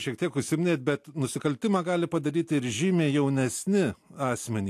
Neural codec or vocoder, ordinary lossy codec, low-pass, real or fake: none; AAC, 64 kbps; 14.4 kHz; real